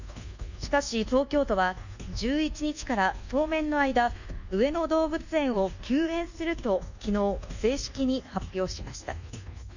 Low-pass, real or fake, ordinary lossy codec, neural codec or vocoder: 7.2 kHz; fake; none; codec, 24 kHz, 1.2 kbps, DualCodec